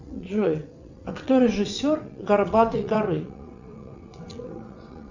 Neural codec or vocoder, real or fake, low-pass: vocoder, 22.05 kHz, 80 mel bands, WaveNeXt; fake; 7.2 kHz